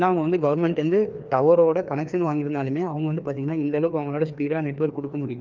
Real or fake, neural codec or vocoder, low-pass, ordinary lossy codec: fake; codec, 16 kHz, 2 kbps, FreqCodec, larger model; 7.2 kHz; Opus, 24 kbps